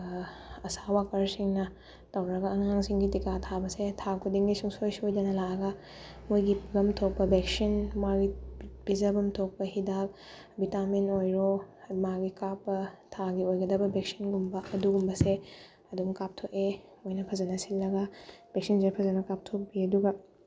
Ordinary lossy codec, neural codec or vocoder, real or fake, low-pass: none; none; real; none